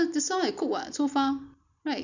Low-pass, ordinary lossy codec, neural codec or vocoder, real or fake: 7.2 kHz; Opus, 64 kbps; none; real